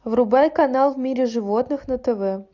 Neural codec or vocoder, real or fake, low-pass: none; real; 7.2 kHz